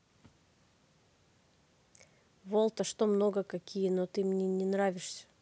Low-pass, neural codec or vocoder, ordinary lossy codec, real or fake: none; none; none; real